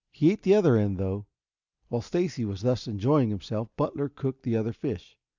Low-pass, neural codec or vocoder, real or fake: 7.2 kHz; none; real